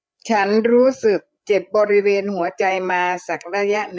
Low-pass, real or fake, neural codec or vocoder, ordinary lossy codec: none; fake; codec, 16 kHz, 8 kbps, FreqCodec, larger model; none